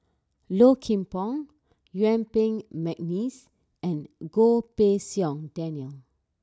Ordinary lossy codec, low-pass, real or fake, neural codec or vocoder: none; none; real; none